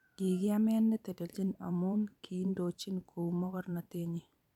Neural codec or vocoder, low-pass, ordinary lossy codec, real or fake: vocoder, 44.1 kHz, 128 mel bands every 512 samples, BigVGAN v2; 19.8 kHz; none; fake